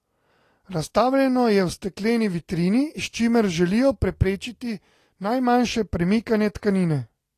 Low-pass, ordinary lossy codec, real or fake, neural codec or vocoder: 14.4 kHz; AAC, 48 kbps; real; none